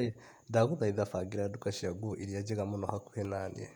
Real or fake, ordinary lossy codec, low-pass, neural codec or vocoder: fake; none; 19.8 kHz; vocoder, 48 kHz, 128 mel bands, Vocos